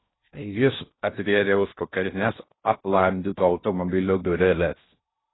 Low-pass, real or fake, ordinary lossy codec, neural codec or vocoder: 7.2 kHz; fake; AAC, 16 kbps; codec, 16 kHz in and 24 kHz out, 0.6 kbps, FocalCodec, streaming, 2048 codes